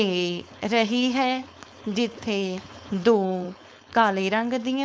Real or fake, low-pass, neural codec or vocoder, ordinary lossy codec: fake; none; codec, 16 kHz, 4.8 kbps, FACodec; none